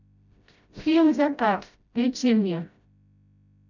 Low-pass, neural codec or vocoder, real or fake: 7.2 kHz; codec, 16 kHz, 0.5 kbps, FreqCodec, smaller model; fake